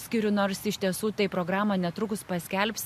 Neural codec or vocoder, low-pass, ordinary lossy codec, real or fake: none; 14.4 kHz; MP3, 64 kbps; real